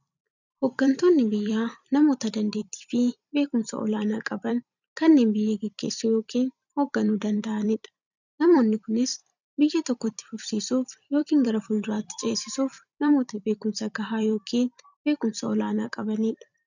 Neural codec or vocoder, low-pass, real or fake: vocoder, 44.1 kHz, 128 mel bands every 256 samples, BigVGAN v2; 7.2 kHz; fake